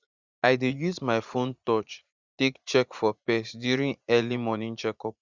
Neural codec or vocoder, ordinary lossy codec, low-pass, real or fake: vocoder, 44.1 kHz, 80 mel bands, Vocos; Opus, 64 kbps; 7.2 kHz; fake